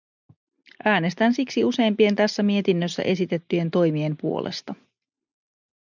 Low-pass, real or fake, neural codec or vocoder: 7.2 kHz; real; none